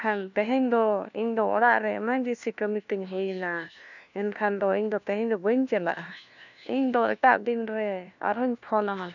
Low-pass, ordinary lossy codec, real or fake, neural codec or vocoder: 7.2 kHz; none; fake; codec, 16 kHz, 1 kbps, FunCodec, trained on LibriTTS, 50 frames a second